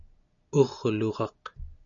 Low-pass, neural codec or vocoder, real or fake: 7.2 kHz; none; real